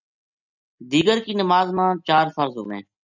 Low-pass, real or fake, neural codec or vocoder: 7.2 kHz; real; none